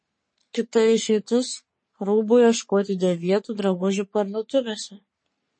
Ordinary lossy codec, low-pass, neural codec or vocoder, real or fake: MP3, 32 kbps; 9.9 kHz; codec, 44.1 kHz, 3.4 kbps, Pupu-Codec; fake